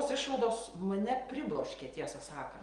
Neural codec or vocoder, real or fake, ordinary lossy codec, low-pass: none; real; Opus, 24 kbps; 9.9 kHz